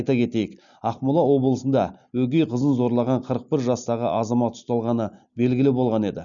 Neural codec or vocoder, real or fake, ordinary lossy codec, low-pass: none; real; none; 7.2 kHz